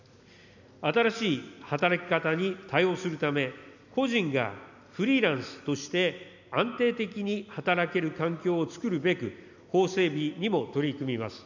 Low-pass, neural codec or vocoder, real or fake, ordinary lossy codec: 7.2 kHz; none; real; none